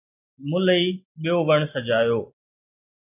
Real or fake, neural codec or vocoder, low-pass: real; none; 3.6 kHz